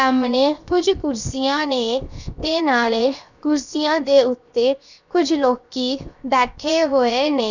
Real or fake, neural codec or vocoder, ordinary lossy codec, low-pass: fake; codec, 16 kHz, 0.7 kbps, FocalCodec; none; 7.2 kHz